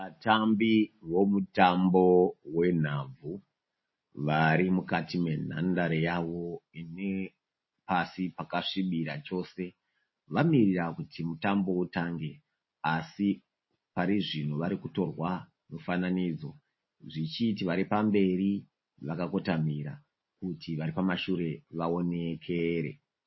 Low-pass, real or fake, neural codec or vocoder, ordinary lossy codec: 7.2 kHz; real; none; MP3, 24 kbps